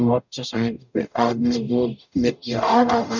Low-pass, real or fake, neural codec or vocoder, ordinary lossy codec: 7.2 kHz; fake; codec, 44.1 kHz, 0.9 kbps, DAC; none